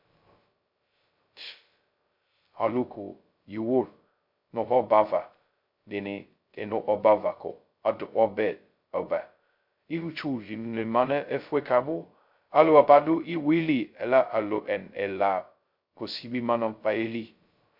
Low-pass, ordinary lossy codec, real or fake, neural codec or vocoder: 5.4 kHz; MP3, 48 kbps; fake; codec, 16 kHz, 0.2 kbps, FocalCodec